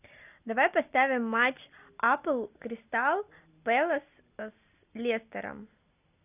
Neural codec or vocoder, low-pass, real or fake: none; 3.6 kHz; real